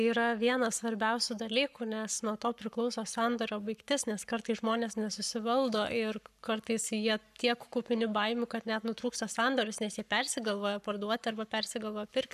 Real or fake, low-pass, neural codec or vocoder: fake; 14.4 kHz; codec, 44.1 kHz, 7.8 kbps, Pupu-Codec